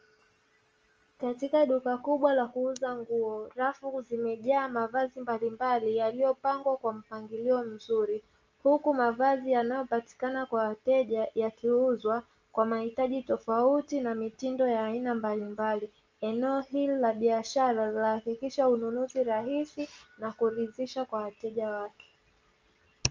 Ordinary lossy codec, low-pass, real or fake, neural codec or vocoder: Opus, 24 kbps; 7.2 kHz; real; none